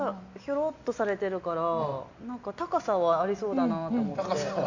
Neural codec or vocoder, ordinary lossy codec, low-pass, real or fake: none; Opus, 64 kbps; 7.2 kHz; real